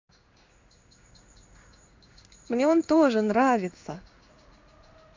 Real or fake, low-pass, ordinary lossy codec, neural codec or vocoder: fake; 7.2 kHz; MP3, 64 kbps; codec, 16 kHz in and 24 kHz out, 1 kbps, XY-Tokenizer